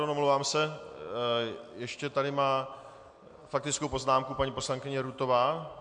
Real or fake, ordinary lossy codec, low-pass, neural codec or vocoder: real; MP3, 64 kbps; 9.9 kHz; none